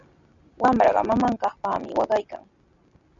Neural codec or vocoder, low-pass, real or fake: none; 7.2 kHz; real